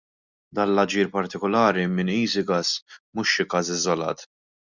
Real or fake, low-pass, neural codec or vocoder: real; 7.2 kHz; none